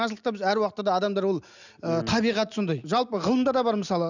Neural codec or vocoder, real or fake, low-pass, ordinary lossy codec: none; real; 7.2 kHz; none